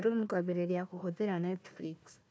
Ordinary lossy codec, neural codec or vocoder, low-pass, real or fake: none; codec, 16 kHz, 1 kbps, FunCodec, trained on Chinese and English, 50 frames a second; none; fake